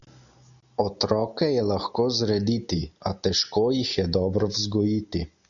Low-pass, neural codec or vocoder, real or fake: 7.2 kHz; none; real